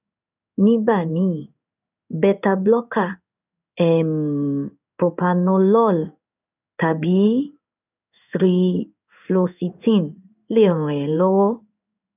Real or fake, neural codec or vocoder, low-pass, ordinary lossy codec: fake; codec, 16 kHz in and 24 kHz out, 1 kbps, XY-Tokenizer; 3.6 kHz; none